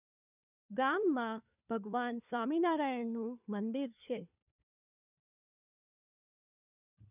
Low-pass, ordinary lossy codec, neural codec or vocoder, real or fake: 3.6 kHz; none; codec, 16 kHz, 4 kbps, FunCodec, trained on LibriTTS, 50 frames a second; fake